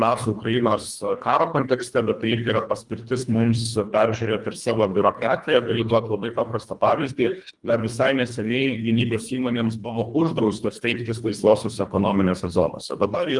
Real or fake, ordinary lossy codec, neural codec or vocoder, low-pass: fake; Opus, 32 kbps; codec, 24 kHz, 1.5 kbps, HILCodec; 10.8 kHz